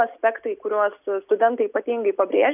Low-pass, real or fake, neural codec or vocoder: 3.6 kHz; real; none